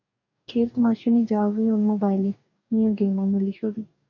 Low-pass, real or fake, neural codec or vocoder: 7.2 kHz; fake; codec, 44.1 kHz, 2.6 kbps, DAC